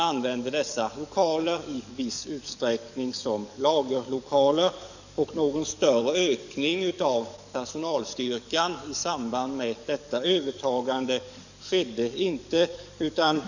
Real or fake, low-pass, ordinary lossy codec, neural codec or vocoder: fake; 7.2 kHz; none; codec, 44.1 kHz, 7.8 kbps, DAC